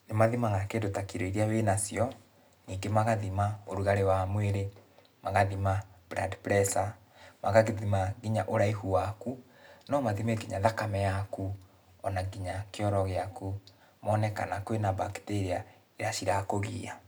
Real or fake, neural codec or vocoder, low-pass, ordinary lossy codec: real; none; none; none